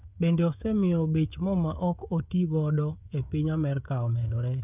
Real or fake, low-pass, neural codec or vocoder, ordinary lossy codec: fake; 3.6 kHz; codec, 16 kHz, 16 kbps, FreqCodec, smaller model; none